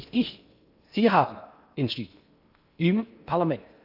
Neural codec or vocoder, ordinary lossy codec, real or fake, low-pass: codec, 16 kHz in and 24 kHz out, 0.8 kbps, FocalCodec, streaming, 65536 codes; none; fake; 5.4 kHz